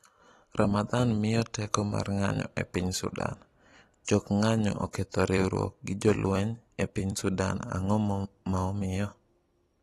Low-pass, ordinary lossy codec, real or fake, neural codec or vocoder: 19.8 kHz; AAC, 32 kbps; fake; vocoder, 44.1 kHz, 128 mel bands every 256 samples, BigVGAN v2